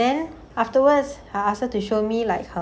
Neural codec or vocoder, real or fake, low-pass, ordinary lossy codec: none; real; none; none